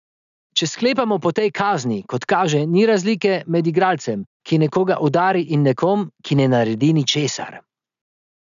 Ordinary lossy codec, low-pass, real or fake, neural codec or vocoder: none; 7.2 kHz; real; none